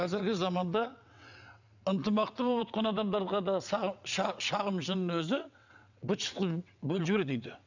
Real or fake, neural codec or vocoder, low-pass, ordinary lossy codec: fake; vocoder, 22.05 kHz, 80 mel bands, WaveNeXt; 7.2 kHz; none